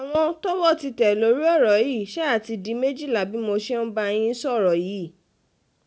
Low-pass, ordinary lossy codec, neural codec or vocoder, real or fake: none; none; none; real